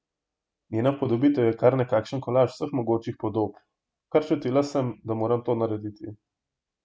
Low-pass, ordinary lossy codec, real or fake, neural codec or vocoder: none; none; real; none